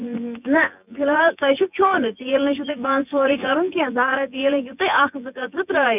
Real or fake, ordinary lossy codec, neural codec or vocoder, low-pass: fake; none; vocoder, 24 kHz, 100 mel bands, Vocos; 3.6 kHz